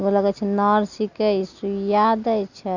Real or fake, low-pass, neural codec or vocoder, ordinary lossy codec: real; 7.2 kHz; none; Opus, 64 kbps